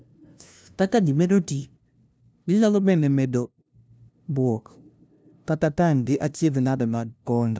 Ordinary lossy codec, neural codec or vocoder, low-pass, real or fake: none; codec, 16 kHz, 0.5 kbps, FunCodec, trained on LibriTTS, 25 frames a second; none; fake